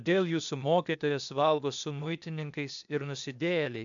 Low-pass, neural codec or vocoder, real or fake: 7.2 kHz; codec, 16 kHz, 0.8 kbps, ZipCodec; fake